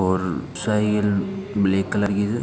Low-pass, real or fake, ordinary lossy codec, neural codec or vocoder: none; real; none; none